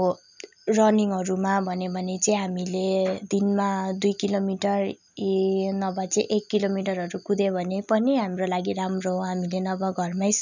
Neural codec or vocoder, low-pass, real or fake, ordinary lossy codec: none; 7.2 kHz; real; none